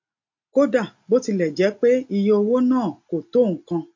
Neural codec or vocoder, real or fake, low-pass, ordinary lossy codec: none; real; 7.2 kHz; MP3, 48 kbps